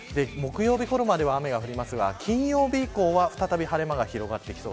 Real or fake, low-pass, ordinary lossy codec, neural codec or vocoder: real; none; none; none